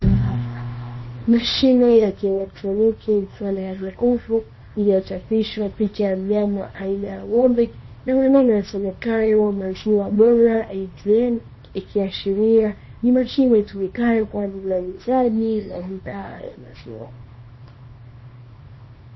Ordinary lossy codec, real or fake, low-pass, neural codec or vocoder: MP3, 24 kbps; fake; 7.2 kHz; codec, 24 kHz, 0.9 kbps, WavTokenizer, small release